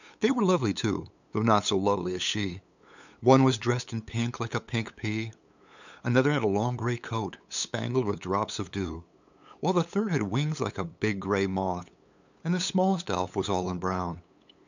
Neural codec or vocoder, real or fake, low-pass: codec, 16 kHz, 8 kbps, FunCodec, trained on LibriTTS, 25 frames a second; fake; 7.2 kHz